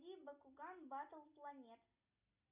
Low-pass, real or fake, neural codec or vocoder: 3.6 kHz; real; none